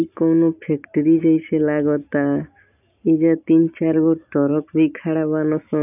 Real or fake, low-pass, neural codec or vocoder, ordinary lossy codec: real; 3.6 kHz; none; AAC, 32 kbps